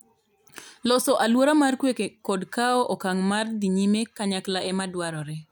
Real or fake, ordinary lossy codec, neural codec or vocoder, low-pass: real; none; none; none